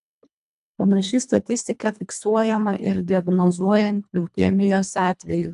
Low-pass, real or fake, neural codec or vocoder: 10.8 kHz; fake; codec, 24 kHz, 1.5 kbps, HILCodec